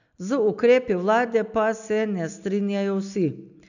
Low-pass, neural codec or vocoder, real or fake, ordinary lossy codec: 7.2 kHz; none; real; none